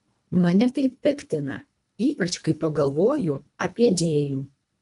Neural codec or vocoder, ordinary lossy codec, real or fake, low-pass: codec, 24 kHz, 1.5 kbps, HILCodec; AAC, 96 kbps; fake; 10.8 kHz